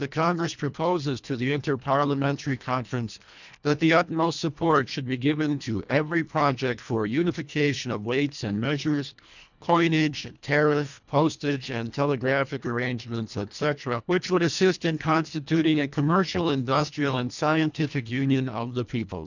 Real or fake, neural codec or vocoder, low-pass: fake; codec, 24 kHz, 1.5 kbps, HILCodec; 7.2 kHz